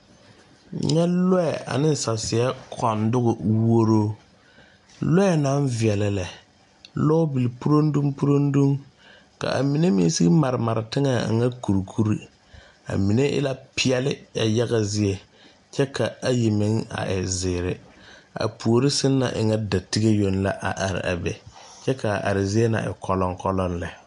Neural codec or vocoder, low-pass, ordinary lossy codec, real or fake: none; 10.8 kHz; MP3, 64 kbps; real